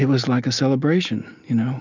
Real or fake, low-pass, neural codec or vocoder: real; 7.2 kHz; none